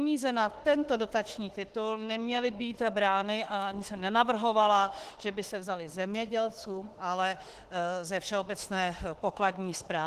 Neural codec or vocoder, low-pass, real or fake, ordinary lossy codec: autoencoder, 48 kHz, 32 numbers a frame, DAC-VAE, trained on Japanese speech; 14.4 kHz; fake; Opus, 16 kbps